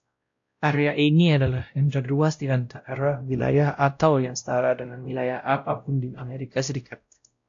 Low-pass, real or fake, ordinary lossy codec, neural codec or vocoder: 7.2 kHz; fake; AAC, 48 kbps; codec, 16 kHz, 0.5 kbps, X-Codec, WavLM features, trained on Multilingual LibriSpeech